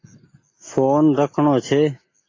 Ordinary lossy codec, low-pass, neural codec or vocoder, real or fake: AAC, 32 kbps; 7.2 kHz; none; real